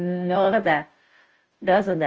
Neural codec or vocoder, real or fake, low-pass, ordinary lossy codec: codec, 16 kHz, 0.5 kbps, FunCodec, trained on Chinese and English, 25 frames a second; fake; 7.2 kHz; Opus, 24 kbps